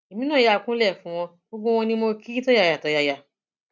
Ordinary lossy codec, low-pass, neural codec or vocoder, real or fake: none; none; none; real